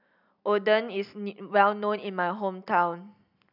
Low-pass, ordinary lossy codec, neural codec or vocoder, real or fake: 5.4 kHz; none; none; real